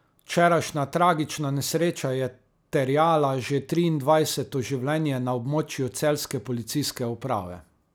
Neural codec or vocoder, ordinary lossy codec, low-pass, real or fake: none; none; none; real